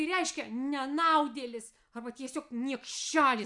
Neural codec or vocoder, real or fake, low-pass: none; real; 10.8 kHz